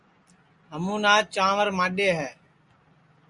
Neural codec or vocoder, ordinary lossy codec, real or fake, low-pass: none; Opus, 64 kbps; real; 10.8 kHz